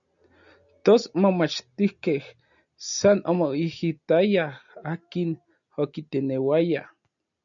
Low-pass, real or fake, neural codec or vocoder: 7.2 kHz; real; none